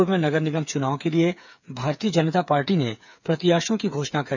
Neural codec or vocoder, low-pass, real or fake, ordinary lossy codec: codec, 16 kHz, 8 kbps, FreqCodec, smaller model; 7.2 kHz; fake; none